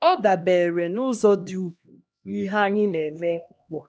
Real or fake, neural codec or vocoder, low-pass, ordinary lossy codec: fake; codec, 16 kHz, 1 kbps, X-Codec, HuBERT features, trained on LibriSpeech; none; none